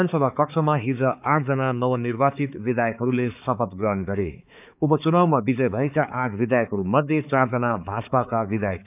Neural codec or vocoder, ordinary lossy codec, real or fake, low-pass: codec, 16 kHz, 4 kbps, X-Codec, HuBERT features, trained on balanced general audio; none; fake; 3.6 kHz